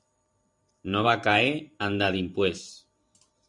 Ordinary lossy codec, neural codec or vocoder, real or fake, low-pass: MP3, 64 kbps; vocoder, 44.1 kHz, 128 mel bands every 512 samples, BigVGAN v2; fake; 10.8 kHz